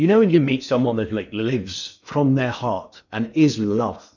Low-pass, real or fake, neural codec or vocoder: 7.2 kHz; fake; codec, 16 kHz in and 24 kHz out, 0.8 kbps, FocalCodec, streaming, 65536 codes